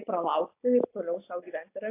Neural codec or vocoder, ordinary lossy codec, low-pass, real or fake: none; AAC, 24 kbps; 3.6 kHz; real